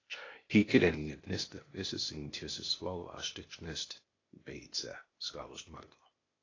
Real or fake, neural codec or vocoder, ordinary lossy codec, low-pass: fake; codec, 16 kHz, 0.8 kbps, ZipCodec; AAC, 32 kbps; 7.2 kHz